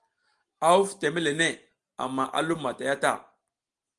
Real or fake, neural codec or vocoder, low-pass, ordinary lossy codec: real; none; 10.8 kHz; Opus, 24 kbps